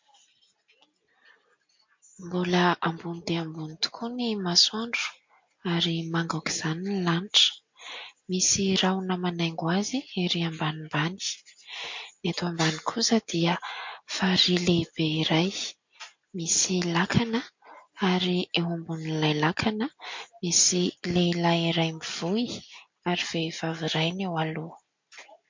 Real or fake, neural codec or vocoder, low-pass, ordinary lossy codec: real; none; 7.2 kHz; MP3, 48 kbps